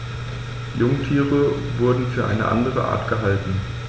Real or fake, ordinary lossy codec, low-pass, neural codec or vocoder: real; none; none; none